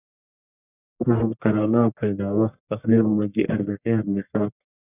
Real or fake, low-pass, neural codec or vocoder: fake; 3.6 kHz; codec, 44.1 kHz, 1.7 kbps, Pupu-Codec